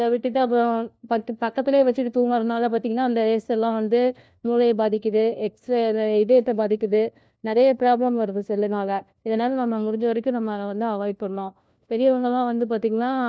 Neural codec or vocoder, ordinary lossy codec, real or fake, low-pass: codec, 16 kHz, 1 kbps, FunCodec, trained on LibriTTS, 50 frames a second; none; fake; none